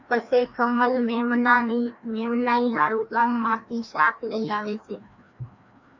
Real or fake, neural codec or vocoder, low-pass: fake; codec, 16 kHz, 1 kbps, FreqCodec, larger model; 7.2 kHz